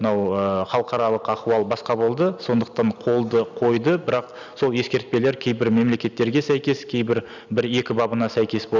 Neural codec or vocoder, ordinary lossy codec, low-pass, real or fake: none; none; 7.2 kHz; real